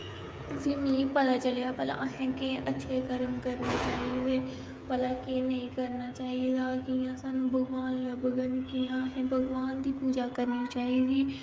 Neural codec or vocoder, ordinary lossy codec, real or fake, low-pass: codec, 16 kHz, 8 kbps, FreqCodec, smaller model; none; fake; none